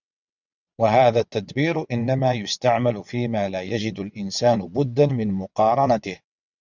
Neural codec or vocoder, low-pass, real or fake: vocoder, 22.05 kHz, 80 mel bands, WaveNeXt; 7.2 kHz; fake